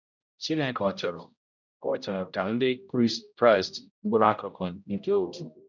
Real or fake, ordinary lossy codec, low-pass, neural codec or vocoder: fake; none; 7.2 kHz; codec, 16 kHz, 0.5 kbps, X-Codec, HuBERT features, trained on general audio